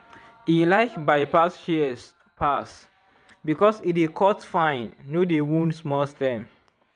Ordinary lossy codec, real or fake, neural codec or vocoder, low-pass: MP3, 96 kbps; fake; vocoder, 22.05 kHz, 80 mel bands, WaveNeXt; 9.9 kHz